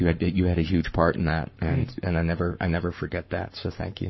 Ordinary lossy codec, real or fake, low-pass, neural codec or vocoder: MP3, 24 kbps; fake; 7.2 kHz; codec, 44.1 kHz, 7.8 kbps, Pupu-Codec